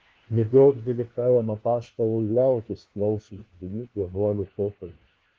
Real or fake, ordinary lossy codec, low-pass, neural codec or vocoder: fake; Opus, 16 kbps; 7.2 kHz; codec, 16 kHz, 1 kbps, FunCodec, trained on LibriTTS, 50 frames a second